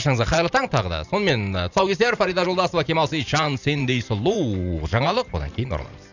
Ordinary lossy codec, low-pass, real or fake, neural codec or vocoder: none; 7.2 kHz; fake; vocoder, 44.1 kHz, 128 mel bands every 512 samples, BigVGAN v2